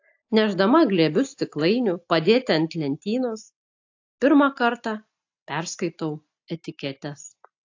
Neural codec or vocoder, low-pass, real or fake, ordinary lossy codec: none; 7.2 kHz; real; AAC, 48 kbps